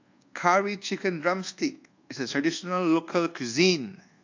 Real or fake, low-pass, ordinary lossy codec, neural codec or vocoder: fake; 7.2 kHz; AAC, 48 kbps; codec, 24 kHz, 1.2 kbps, DualCodec